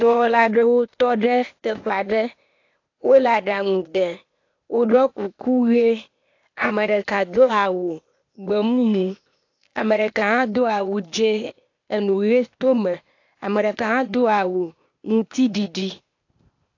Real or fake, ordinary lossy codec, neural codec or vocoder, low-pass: fake; AAC, 48 kbps; codec, 16 kHz, 0.8 kbps, ZipCodec; 7.2 kHz